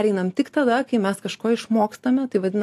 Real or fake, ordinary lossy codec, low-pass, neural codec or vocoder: real; AAC, 64 kbps; 14.4 kHz; none